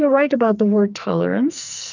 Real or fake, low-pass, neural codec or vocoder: fake; 7.2 kHz; codec, 44.1 kHz, 2.6 kbps, SNAC